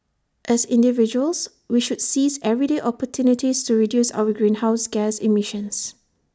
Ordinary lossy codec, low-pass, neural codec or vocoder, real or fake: none; none; none; real